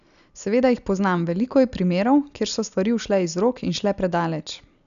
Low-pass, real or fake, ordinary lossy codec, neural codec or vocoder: 7.2 kHz; real; none; none